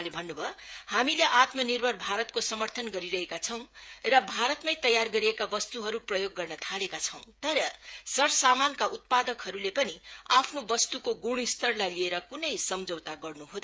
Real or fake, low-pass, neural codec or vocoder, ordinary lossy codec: fake; none; codec, 16 kHz, 8 kbps, FreqCodec, smaller model; none